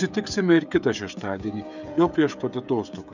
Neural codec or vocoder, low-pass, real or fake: codec, 16 kHz, 16 kbps, FreqCodec, smaller model; 7.2 kHz; fake